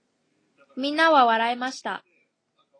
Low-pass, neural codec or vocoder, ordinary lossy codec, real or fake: 9.9 kHz; none; AAC, 32 kbps; real